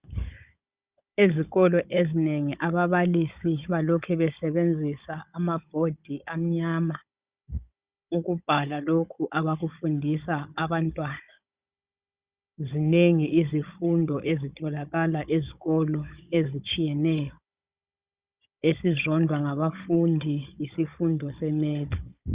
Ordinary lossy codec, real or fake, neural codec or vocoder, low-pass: Opus, 64 kbps; fake; codec, 16 kHz, 16 kbps, FunCodec, trained on Chinese and English, 50 frames a second; 3.6 kHz